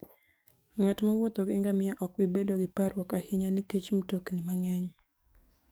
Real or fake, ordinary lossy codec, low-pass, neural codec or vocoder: fake; none; none; codec, 44.1 kHz, 7.8 kbps, DAC